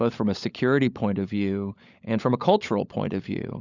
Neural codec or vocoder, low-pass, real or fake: codec, 16 kHz, 16 kbps, FunCodec, trained on LibriTTS, 50 frames a second; 7.2 kHz; fake